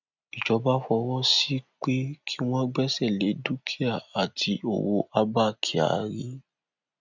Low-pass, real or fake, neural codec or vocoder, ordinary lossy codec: 7.2 kHz; real; none; none